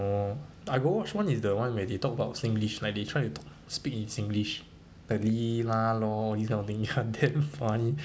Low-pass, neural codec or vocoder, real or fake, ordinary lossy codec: none; none; real; none